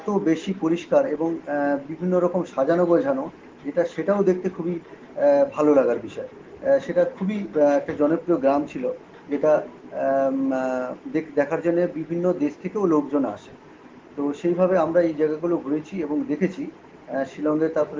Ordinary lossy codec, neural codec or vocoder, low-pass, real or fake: Opus, 16 kbps; none; 7.2 kHz; real